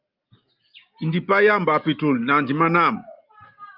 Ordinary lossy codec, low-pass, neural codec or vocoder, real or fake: Opus, 24 kbps; 5.4 kHz; none; real